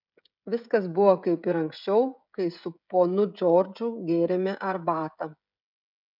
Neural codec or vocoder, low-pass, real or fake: codec, 16 kHz, 16 kbps, FreqCodec, smaller model; 5.4 kHz; fake